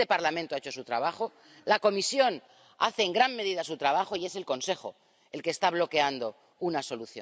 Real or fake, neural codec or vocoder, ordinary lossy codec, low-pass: real; none; none; none